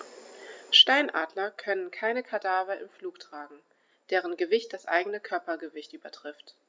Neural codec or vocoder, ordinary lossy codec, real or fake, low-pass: none; none; real; none